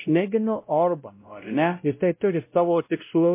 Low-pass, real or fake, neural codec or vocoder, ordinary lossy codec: 3.6 kHz; fake; codec, 16 kHz, 0.5 kbps, X-Codec, WavLM features, trained on Multilingual LibriSpeech; MP3, 24 kbps